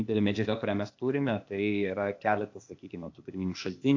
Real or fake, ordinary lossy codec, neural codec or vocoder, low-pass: fake; AAC, 48 kbps; codec, 16 kHz, 0.8 kbps, ZipCodec; 7.2 kHz